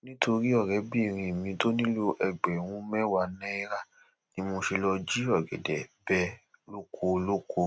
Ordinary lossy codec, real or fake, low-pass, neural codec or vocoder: none; real; none; none